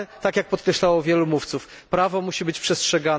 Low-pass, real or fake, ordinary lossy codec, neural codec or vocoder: none; real; none; none